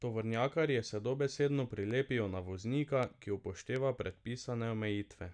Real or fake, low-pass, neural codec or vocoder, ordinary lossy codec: real; 9.9 kHz; none; none